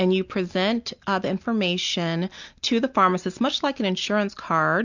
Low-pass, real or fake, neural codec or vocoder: 7.2 kHz; real; none